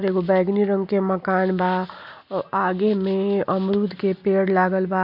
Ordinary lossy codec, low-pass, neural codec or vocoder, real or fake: none; 5.4 kHz; none; real